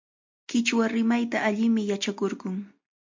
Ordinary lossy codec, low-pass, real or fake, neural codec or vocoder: MP3, 48 kbps; 7.2 kHz; real; none